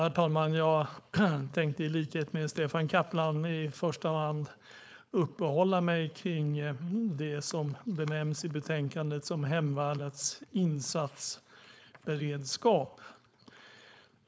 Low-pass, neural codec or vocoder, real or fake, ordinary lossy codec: none; codec, 16 kHz, 4.8 kbps, FACodec; fake; none